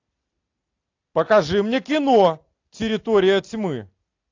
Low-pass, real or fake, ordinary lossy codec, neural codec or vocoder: 7.2 kHz; real; AAC, 48 kbps; none